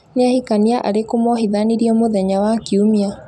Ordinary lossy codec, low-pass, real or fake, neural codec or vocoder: none; none; real; none